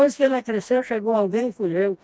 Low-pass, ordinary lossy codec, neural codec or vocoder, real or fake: none; none; codec, 16 kHz, 1 kbps, FreqCodec, smaller model; fake